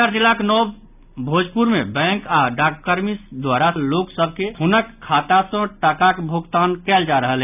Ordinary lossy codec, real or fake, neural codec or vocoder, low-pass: none; real; none; 3.6 kHz